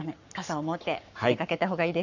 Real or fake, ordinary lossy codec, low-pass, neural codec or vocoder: fake; none; 7.2 kHz; codec, 44.1 kHz, 7.8 kbps, Pupu-Codec